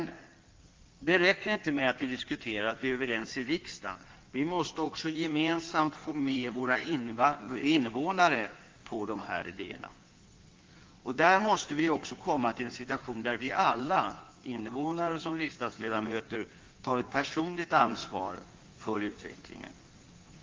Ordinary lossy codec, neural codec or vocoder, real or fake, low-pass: Opus, 32 kbps; codec, 16 kHz in and 24 kHz out, 1.1 kbps, FireRedTTS-2 codec; fake; 7.2 kHz